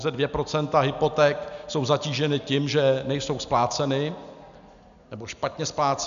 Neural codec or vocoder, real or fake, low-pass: none; real; 7.2 kHz